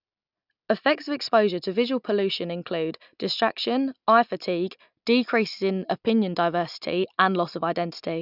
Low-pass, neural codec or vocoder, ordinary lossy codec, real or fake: 5.4 kHz; none; none; real